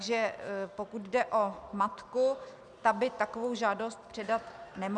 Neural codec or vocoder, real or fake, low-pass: none; real; 10.8 kHz